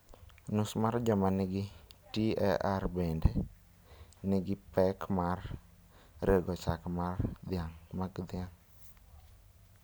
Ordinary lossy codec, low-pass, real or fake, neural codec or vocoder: none; none; real; none